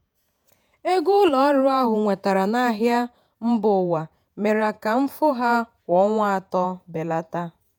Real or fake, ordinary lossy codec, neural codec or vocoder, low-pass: fake; none; vocoder, 48 kHz, 128 mel bands, Vocos; none